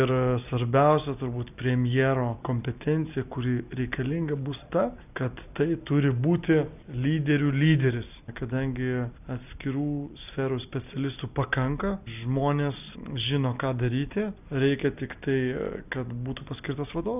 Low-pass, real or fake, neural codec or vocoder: 3.6 kHz; real; none